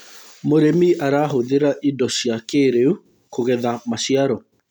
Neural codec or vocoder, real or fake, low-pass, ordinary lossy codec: none; real; 19.8 kHz; none